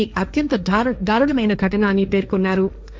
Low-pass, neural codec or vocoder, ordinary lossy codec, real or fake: none; codec, 16 kHz, 1.1 kbps, Voila-Tokenizer; none; fake